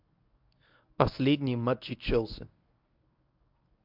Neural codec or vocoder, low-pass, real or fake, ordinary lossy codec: codec, 24 kHz, 0.9 kbps, WavTokenizer, medium speech release version 1; 5.4 kHz; fake; MP3, 48 kbps